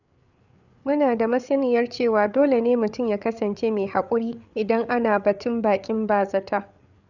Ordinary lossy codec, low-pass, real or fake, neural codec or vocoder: none; 7.2 kHz; fake; codec, 16 kHz, 8 kbps, FreqCodec, larger model